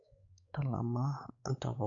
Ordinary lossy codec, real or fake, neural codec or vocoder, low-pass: AAC, 64 kbps; fake; codec, 16 kHz, 4 kbps, X-Codec, WavLM features, trained on Multilingual LibriSpeech; 7.2 kHz